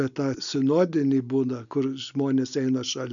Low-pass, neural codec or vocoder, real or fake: 7.2 kHz; none; real